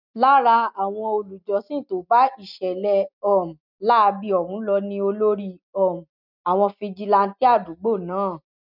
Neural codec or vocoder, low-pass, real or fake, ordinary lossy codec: none; 5.4 kHz; real; none